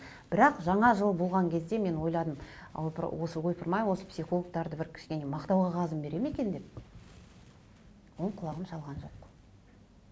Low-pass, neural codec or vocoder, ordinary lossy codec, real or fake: none; none; none; real